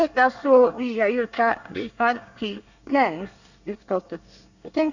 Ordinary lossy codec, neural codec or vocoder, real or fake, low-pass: none; codec, 24 kHz, 1 kbps, SNAC; fake; 7.2 kHz